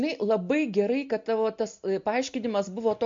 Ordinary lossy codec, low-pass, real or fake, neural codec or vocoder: MP3, 48 kbps; 7.2 kHz; real; none